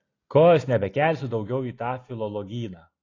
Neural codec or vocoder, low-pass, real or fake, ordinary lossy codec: none; 7.2 kHz; real; AAC, 32 kbps